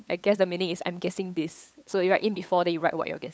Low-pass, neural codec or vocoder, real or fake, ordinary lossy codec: none; codec, 16 kHz, 8 kbps, FunCodec, trained on LibriTTS, 25 frames a second; fake; none